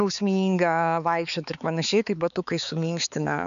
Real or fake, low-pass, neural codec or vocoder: fake; 7.2 kHz; codec, 16 kHz, 4 kbps, X-Codec, HuBERT features, trained on balanced general audio